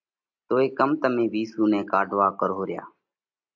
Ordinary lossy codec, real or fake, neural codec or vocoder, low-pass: MP3, 48 kbps; real; none; 7.2 kHz